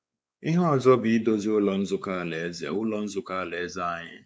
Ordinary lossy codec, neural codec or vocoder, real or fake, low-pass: none; codec, 16 kHz, 4 kbps, X-Codec, WavLM features, trained on Multilingual LibriSpeech; fake; none